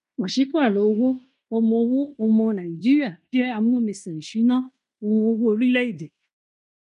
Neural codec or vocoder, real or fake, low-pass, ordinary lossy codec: codec, 16 kHz in and 24 kHz out, 0.9 kbps, LongCat-Audio-Codec, fine tuned four codebook decoder; fake; 10.8 kHz; none